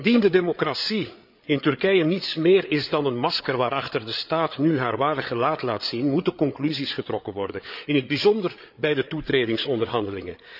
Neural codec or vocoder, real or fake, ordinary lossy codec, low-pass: codec, 16 kHz, 8 kbps, FreqCodec, larger model; fake; none; 5.4 kHz